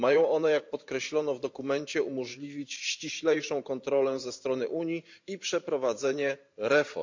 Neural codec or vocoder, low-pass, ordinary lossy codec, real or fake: vocoder, 44.1 kHz, 128 mel bands every 512 samples, BigVGAN v2; 7.2 kHz; MP3, 64 kbps; fake